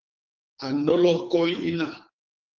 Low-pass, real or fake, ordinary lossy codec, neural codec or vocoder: 7.2 kHz; fake; Opus, 32 kbps; codec, 24 kHz, 3 kbps, HILCodec